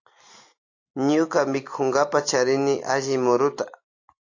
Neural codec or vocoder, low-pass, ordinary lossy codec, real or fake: none; 7.2 kHz; AAC, 48 kbps; real